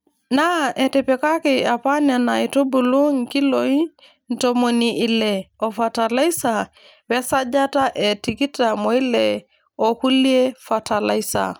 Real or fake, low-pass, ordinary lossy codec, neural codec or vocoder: real; none; none; none